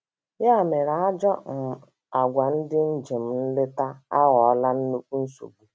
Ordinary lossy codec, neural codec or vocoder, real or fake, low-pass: none; none; real; none